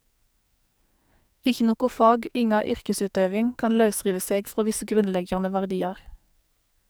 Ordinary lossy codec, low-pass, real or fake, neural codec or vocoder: none; none; fake; codec, 44.1 kHz, 2.6 kbps, SNAC